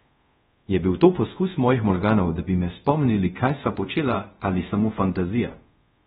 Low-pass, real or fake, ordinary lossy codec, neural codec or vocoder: 10.8 kHz; fake; AAC, 16 kbps; codec, 24 kHz, 0.5 kbps, DualCodec